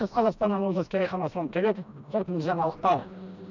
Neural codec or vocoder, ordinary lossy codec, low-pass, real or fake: codec, 16 kHz, 1 kbps, FreqCodec, smaller model; none; 7.2 kHz; fake